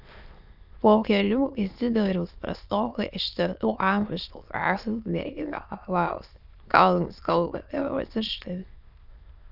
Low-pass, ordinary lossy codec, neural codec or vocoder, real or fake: 5.4 kHz; Opus, 64 kbps; autoencoder, 22.05 kHz, a latent of 192 numbers a frame, VITS, trained on many speakers; fake